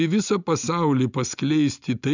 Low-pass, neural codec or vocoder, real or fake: 7.2 kHz; none; real